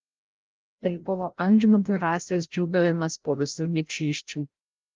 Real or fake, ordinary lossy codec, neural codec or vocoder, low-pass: fake; Opus, 32 kbps; codec, 16 kHz, 0.5 kbps, FreqCodec, larger model; 7.2 kHz